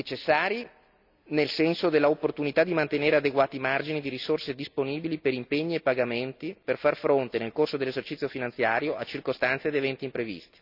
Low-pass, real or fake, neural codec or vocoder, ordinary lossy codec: 5.4 kHz; real; none; none